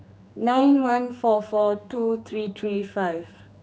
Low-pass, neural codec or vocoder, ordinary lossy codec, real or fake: none; codec, 16 kHz, 2 kbps, X-Codec, HuBERT features, trained on general audio; none; fake